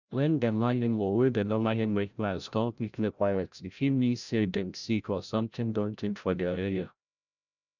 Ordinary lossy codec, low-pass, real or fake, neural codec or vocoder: none; 7.2 kHz; fake; codec, 16 kHz, 0.5 kbps, FreqCodec, larger model